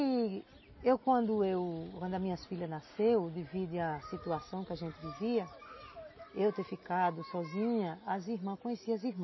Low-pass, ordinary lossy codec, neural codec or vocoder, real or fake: 7.2 kHz; MP3, 24 kbps; none; real